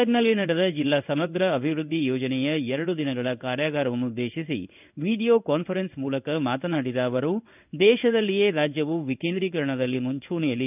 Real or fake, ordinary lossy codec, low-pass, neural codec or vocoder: fake; none; 3.6 kHz; codec, 16 kHz in and 24 kHz out, 1 kbps, XY-Tokenizer